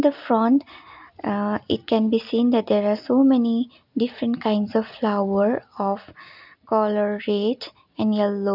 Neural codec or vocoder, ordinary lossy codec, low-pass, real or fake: none; none; 5.4 kHz; real